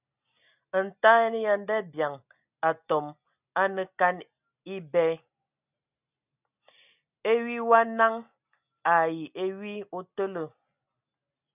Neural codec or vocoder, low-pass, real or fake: none; 3.6 kHz; real